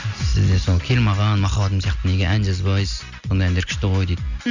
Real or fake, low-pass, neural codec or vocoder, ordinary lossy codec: real; 7.2 kHz; none; none